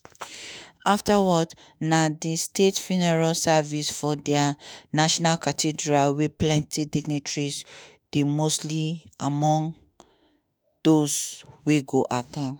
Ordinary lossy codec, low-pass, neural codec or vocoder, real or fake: none; none; autoencoder, 48 kHz, 32 numbers a frame, DAC-VAE, trained on Japanese speech; fake